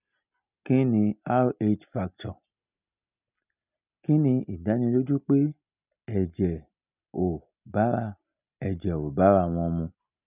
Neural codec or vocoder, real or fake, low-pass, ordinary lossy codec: none; real; 3.6 kHz; none